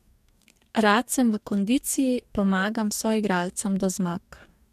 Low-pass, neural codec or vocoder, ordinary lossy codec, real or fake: 14.4 kHz; codec, 44.1 kHz, 2.6 kbps, DAC; none; fake